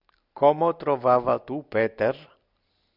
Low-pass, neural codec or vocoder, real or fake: 5.4 kHz; none; real